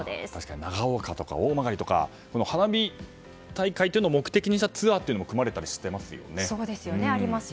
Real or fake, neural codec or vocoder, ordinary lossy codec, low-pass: real; none; none; none